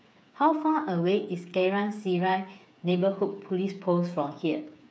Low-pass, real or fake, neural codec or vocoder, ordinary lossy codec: none; fake; codec, 16 kHz, 8 kbps, FreqCodec, smaller model; none